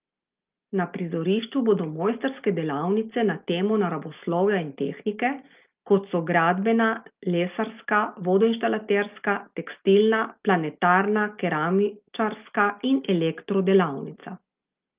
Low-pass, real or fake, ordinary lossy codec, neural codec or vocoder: 3.6 kHz; real; Opus, 32 kbps; none